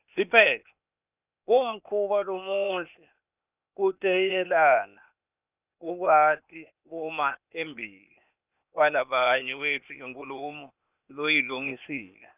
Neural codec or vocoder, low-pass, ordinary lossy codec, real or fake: codec, 16 kHz, 0.8 kbps, ZipCodec; 3.6 kHz; none; fake